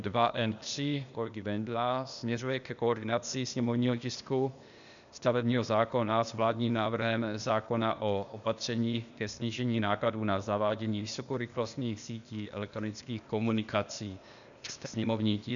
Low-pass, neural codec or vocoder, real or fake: 7.2 kHz; codec, 16 kHz, 0.8 kbps, ZipCodec; fake